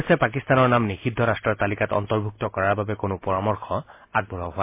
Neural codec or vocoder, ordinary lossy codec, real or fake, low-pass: none; MP3, 24 kbps; real; 3.6 kHz